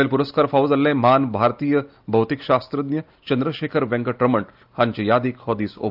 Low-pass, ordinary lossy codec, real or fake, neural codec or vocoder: 5.4 kHz; Opus, 24 kbps; real; none